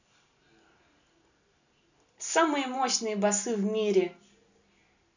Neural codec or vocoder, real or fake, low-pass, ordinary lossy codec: none; real; 7.2 kHz; none